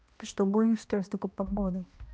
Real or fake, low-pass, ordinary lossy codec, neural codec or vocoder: fake; none; none; codec, 16 kHz, 1 kbps, X-Codec, HuBERT features, trained on balanced general audio